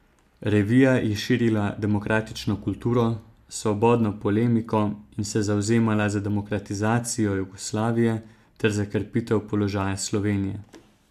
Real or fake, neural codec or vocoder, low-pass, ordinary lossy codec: real; none; 14.4 kHz; AAC, 96 kbps